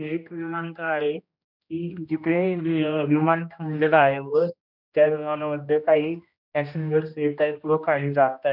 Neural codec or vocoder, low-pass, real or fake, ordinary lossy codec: codec, 16 kHz, 1 kbps, X-Codec, HuBERT features, trained on general audio; 5.4 kHz; fake; none